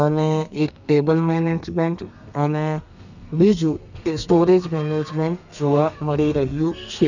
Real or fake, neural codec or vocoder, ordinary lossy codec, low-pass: fake; codec, 32 kHz, 1.9 kbps, SNAC; none; 7.2 kHz